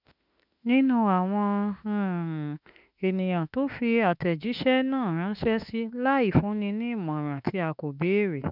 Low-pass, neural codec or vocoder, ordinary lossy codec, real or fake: 5.4 kHz; autoencoder, 48 kHz, 32 numbers a frame, DAC-VAE, trained on Japanese speech; none; fake